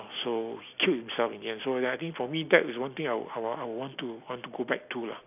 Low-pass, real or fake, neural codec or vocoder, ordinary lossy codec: 3.6 kHz; real; none; none